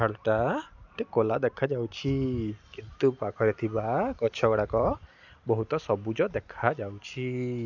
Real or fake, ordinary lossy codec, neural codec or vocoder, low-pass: real; none; none; 7.2 kHz